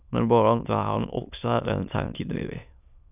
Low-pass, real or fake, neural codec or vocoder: 3.6 kHz; fake; autoencoder, 22.05 kHz, a latent of 192 numbers a frame, VITS, trained on many speakers